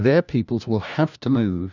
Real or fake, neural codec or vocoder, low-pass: fake; codec, 16 kHz, 1 kbps, FunCodec, trained on LibriTTS, 50 frames a second; 7.2 kHz